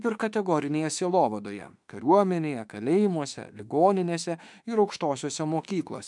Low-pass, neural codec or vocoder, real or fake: 10.8 kHz; autoencoder, 48 kHz, 32 numbers a frame, DAC-VAE, trained on Japanese speech; fake